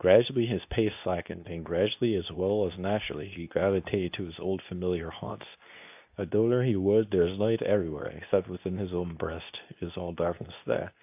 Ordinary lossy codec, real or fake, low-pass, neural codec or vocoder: AAC, 32 kbps; fake; 3.6 kHz; codec, 24 kHz, 0.9 kbps, WavTokenizer, medium speech release version 2